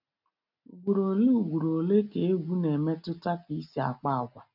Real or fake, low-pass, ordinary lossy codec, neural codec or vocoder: real; 5.4 kHz; none; none